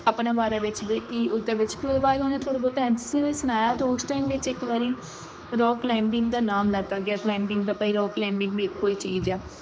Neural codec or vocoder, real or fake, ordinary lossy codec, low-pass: codec, 16 kHz, 2 kbps, X-Codec, HuBERT features, trained on general audio; fake; none; none